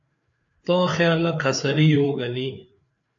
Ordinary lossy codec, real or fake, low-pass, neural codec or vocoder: AAC, 64 kbps; fake; 7.2 kHz; codec, 16 kHz, 4 kbps, FreqCodec, larger model